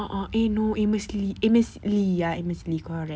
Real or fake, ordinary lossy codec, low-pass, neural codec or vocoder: real; none; none; none